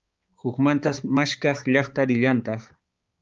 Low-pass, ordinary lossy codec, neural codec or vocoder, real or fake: 7.2 kHz; Opus, 32 kbps; codec, 16 kHz, 4 kbps, X-Codec, HuBERT features, trained on balanced general audio; fake